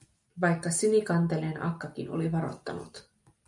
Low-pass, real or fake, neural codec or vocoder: 10.8 kHz; real; none